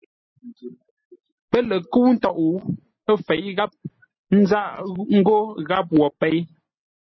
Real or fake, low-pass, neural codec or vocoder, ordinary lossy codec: real; 7.2 kHz; none; MP3, 24 kbps